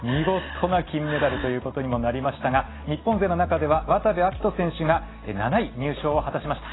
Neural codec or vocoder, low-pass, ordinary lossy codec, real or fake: none; 7.2 kHz; AAC, 16 kbps; real